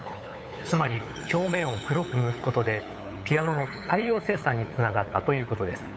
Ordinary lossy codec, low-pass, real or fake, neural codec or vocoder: none; none; fake; codec, 16 kHz, 8 kbps, FunCodec, trained on LibriTTS, 25 frames a second